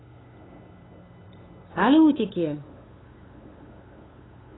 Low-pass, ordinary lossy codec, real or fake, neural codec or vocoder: 7.2 kHz; AAC, 16 kbps; fake; codec, 16 kHz, 8 kbps, FunCodec, trained on LibriTTS, 25 frames a second